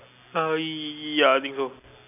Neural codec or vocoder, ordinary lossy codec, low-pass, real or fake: none; none; 3.6 kHz; real